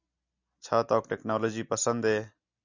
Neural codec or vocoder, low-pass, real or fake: none; 7.2 kHz; real